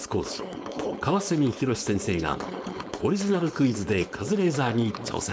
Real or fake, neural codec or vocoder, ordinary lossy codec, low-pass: fake; codec, 16 kHz, 4.8 kbps, FACodec; none; none